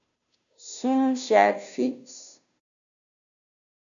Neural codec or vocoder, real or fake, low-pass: codec, 16 kHz, 0.5 kbps, FunCodec, trained on Chinese and English, 25 frames a second; fake; 7.2 kHz